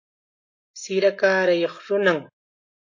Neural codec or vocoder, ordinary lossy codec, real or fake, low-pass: none; MP3, 32 kbps; real; 7.2 kHz